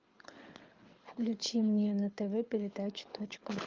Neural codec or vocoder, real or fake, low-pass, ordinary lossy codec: codec, 24 kHz, 6 kbps, HILCodec; fake; 7.2 kHz; Opus, 24 kbps